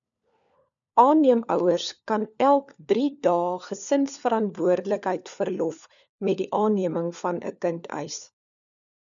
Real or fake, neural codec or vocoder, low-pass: fake; codec, 16 kHz, 4 kbps, FunCodec, trained on LibriTTS, 50 frames a second; 7.2 kHz